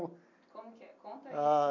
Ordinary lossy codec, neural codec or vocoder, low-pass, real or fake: none; none; 7.2 kHz; real